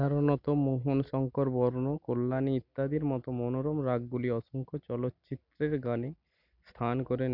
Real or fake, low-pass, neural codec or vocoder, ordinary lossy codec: real; 5.4 kHz; none; none